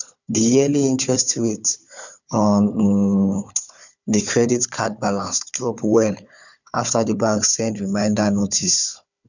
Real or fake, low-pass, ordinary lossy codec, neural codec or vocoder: fake; 7.2 kHz; none; codec, 16 kHz, 4 kbps, FunCodec, trained on Chinese and English, 50 frames a second